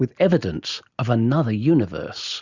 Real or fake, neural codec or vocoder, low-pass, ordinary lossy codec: real; none; 7.2 kHz; Opus, 64 kbps